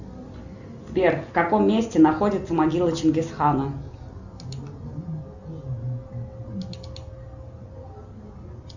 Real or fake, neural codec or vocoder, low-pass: real; none; 7.2 kHz